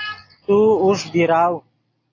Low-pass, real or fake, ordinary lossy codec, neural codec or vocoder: 7.2 kHz; real; AAC, 32 kbps; none